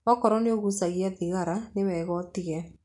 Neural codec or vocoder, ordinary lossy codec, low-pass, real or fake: none; none; 10.8 kHz; real